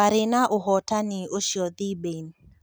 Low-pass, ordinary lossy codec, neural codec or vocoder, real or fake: none; none; none; real